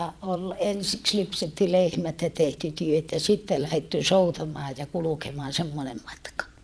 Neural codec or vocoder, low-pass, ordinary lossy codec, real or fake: vocoder, 22.05 kHz, 80 mel bands, Vocos; none; none; fake